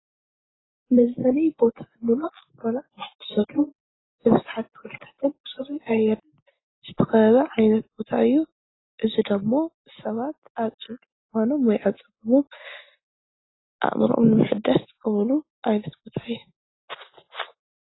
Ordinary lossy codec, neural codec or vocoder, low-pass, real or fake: AAC, 16 kbps; none; 7.2 kHz; real